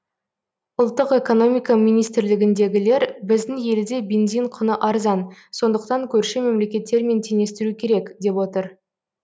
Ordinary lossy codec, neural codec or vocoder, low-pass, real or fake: none; none; none; real